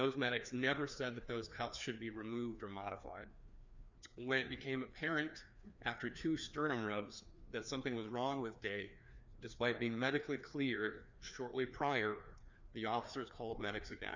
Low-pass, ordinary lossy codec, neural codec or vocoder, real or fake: 7.2 kHz; Opus, 64 kbps; codec, 16 kHz, 2 kbps, FreqCodec, larger model; fake